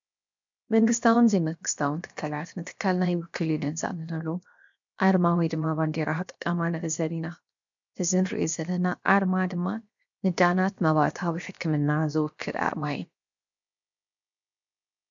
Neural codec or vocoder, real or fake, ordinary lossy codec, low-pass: codec, 16 kHz, 0.7 kbps, FocalCodec; fake; MP3, 64 kbps; 7.2 kHz